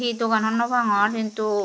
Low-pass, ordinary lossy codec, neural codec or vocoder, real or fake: none; none; none; real